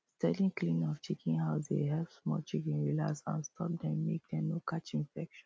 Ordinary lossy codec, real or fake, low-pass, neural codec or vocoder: none; real; none; none